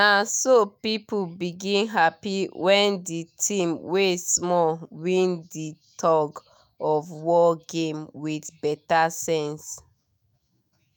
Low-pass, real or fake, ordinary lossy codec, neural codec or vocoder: none; fake; none; autoencoder, 48 kHz, 128 numbers a frame, DAC-VAE, trained on Japanese speech